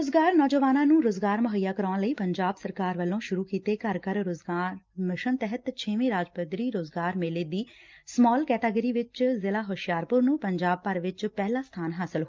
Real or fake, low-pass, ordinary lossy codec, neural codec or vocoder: real; 7.2 kHz; Opus, 24 kbps; none